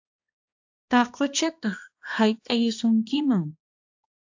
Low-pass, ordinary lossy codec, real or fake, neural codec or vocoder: 7.2 kHz; AAC, 48 kbps; fake; codec, 16 kHz, 1 kbps, X-Codec, HuBERT features, trained on balanced general audio